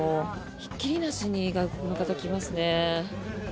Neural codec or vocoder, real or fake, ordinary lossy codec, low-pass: none; real; none; none